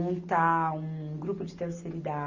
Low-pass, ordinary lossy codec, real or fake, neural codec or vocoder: 7.2 kHz; AAC, 48 kbps; real; none